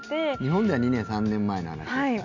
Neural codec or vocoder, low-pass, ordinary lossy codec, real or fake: none; 7.2 kHz; none; real